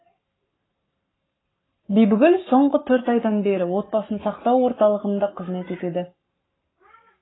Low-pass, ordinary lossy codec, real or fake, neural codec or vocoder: 7.2 kHz; AAC, 16 kbps; fake; codec, 44.1 kHz, 7.8 kbps, Pupu-Codec